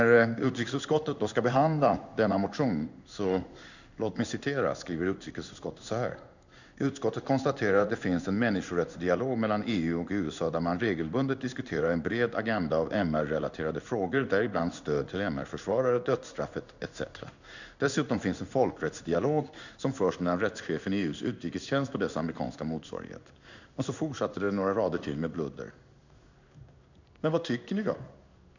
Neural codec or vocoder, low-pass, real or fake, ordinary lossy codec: codec, 16 kHz in and 24 kHz out, 1 kbps, XY-Tokenizer; 7.2 kHz; fake; none